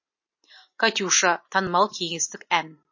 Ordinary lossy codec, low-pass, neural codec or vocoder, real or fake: MP3, 32 kbps; 7.2 kHz; none; real